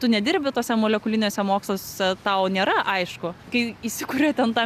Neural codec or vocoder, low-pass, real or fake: none; 14.4 kHz; real